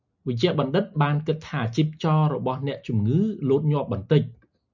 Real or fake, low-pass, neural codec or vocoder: real; 7.2 kHz; none